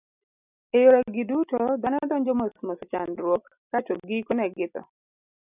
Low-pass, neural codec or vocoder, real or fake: 3.6 kHz; none; real